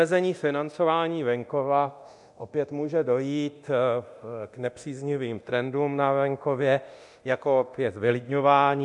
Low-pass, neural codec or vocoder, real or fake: 10.8 kHz; codec, 24 kHz, 0.9 kbps, DualCodec; fake